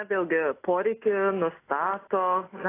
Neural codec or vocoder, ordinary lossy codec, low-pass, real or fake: none; AAC, 16 kbps; 3.6 kHz; real